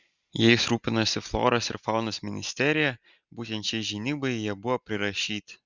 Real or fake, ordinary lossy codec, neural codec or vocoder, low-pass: real; Opus, 64 kbps; none; 7.2 kHz